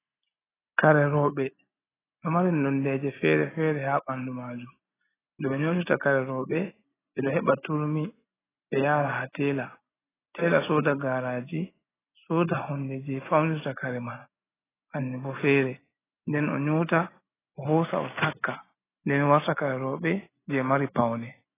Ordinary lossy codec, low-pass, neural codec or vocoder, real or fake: AAC, 16 kbps; 3.6 kHz; none; real